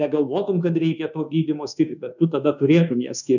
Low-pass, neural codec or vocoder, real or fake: 7.2 kHz; codec, 24 kHz, 1.2 kbps, DualCodec; fake